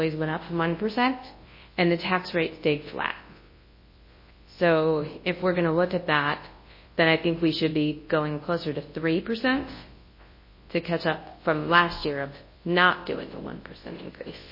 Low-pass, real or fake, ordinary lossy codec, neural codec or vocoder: 5.4 kHz; fake; MP3, 24 kbps; codec, 24 kHz, 0.9 kbps, WavTokenizer, large speech release